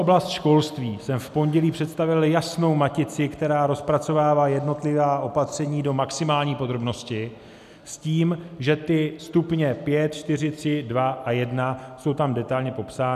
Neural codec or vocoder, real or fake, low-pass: none; real; 14.4 kHz